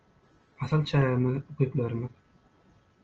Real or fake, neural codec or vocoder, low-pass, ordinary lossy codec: real; none; 7.2 kHz; Opus, 24 kbps